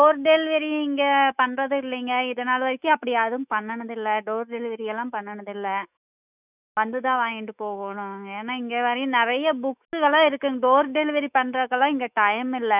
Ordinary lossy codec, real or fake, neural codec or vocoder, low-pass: none; fake; autoencoder, 48 kHz, 128 numbers a frame, DAC-VAE, trained on Japanese speech; 3.6 kHz